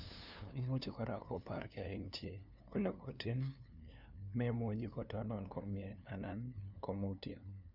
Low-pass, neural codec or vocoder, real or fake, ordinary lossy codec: 5.4 kHz; codec, 16 kHz, 2 kbps, FunCodec, trained on LibriTTS, 25 frames a second; fake; none